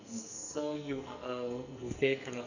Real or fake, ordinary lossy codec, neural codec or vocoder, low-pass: fake; none; codec, 44.1 kHz, 2.6 kbps, SNAC; 7.2 kHz